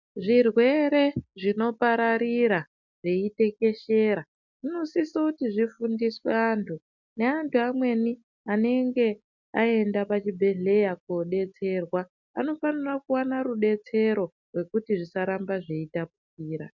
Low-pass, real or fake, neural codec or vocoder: 7.2 kHz; real; none